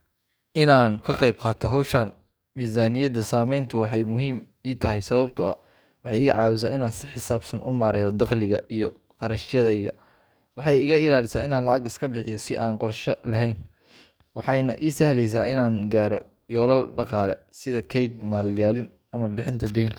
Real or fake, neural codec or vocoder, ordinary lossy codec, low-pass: fake; codec, 44.1 kHz, 2.6 kbps, DAC; none; none